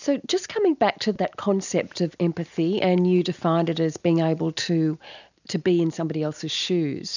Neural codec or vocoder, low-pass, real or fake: none; 7.2 kHz; real